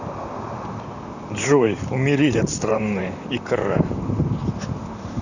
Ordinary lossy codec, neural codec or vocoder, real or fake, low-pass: none; vocoder, 44.1 kHz, 128 mel bands, Pupu-Vocoder; fake; 7.2 kHz